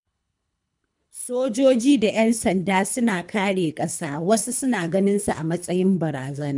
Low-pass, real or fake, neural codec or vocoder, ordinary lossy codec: 10.8 kHz; fake; codec, 24 kHz, 3 kbps, HILCodec; none